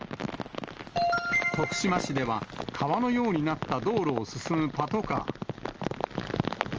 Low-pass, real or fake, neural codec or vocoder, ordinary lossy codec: 7.2 kHz; real; none; Opus, 24 kbps